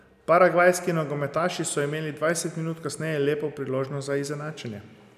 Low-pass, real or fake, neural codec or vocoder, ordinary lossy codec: 14.4 kHz; real; none; none